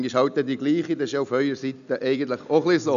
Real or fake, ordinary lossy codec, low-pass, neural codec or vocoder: real; none; 7.2 kHz; none